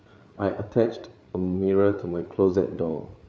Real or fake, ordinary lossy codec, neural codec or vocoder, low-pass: fake; none; codec, 16 kHz, 8 kbps, FreqCodec, larger model; none